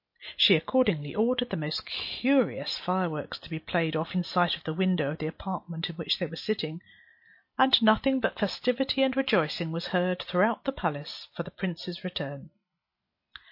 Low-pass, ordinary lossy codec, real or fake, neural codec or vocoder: 5.4 kHz; MP3, 32 kbps; real; none